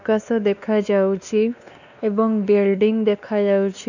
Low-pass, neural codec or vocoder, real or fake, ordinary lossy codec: 7.2 kHz; codec, 16 kHz, 2 kbps, X-Codec, WavLM features, trained on Multilingual LibriSpeech; fake; none